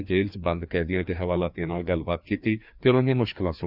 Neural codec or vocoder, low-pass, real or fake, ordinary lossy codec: codec, 16 kHz, 2 kbps, FreqCodec, larger model; 5.4 kHz; fake; none